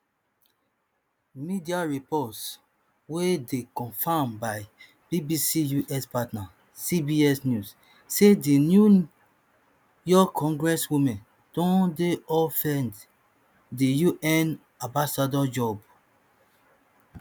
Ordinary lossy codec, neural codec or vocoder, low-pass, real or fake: none; none; none; real